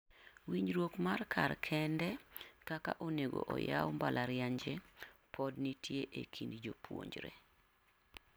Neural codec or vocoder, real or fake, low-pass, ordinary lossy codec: none; real; none; none